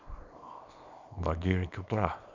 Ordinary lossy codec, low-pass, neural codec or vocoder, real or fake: MP3, 64 kbps; 7.2 kHz; codec, 24 kHz, 0.9 kbps, WavTokenizer, small release; fake